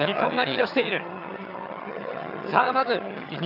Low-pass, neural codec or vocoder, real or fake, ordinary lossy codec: 5.4 kHz; vocoder, 22.05 kHz, 80 mel bands, HiFi-GAN; fake; none